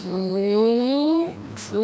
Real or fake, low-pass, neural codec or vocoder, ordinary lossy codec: fake; none; codec, 16 kHz, 1 kbps, FreqCodec, larger model; none